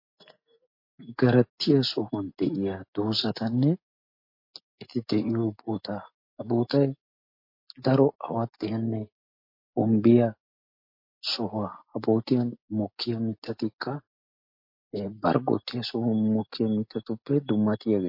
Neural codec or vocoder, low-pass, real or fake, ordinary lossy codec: none; 5.4 kHz; real; MP3, 32 kbps